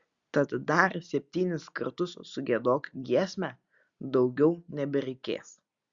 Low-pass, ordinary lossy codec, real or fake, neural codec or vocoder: 7.2 kHz; Opus, 64 kbps; real; none